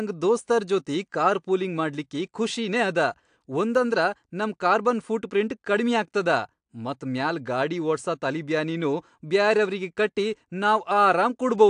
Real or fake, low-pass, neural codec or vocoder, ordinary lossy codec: real; 9.9 kHz; none; AAC, 64 kbps